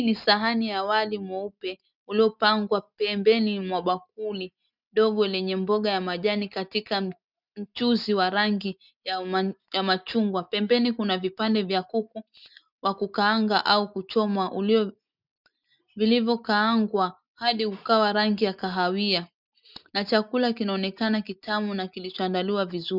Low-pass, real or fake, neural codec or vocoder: 5.4 kHz; real; none